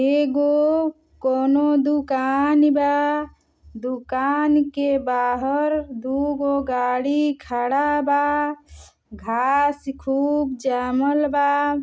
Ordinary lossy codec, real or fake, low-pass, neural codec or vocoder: none; real; none; none